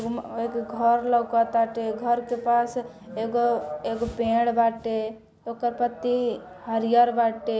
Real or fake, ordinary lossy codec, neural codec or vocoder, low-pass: real; none; none; none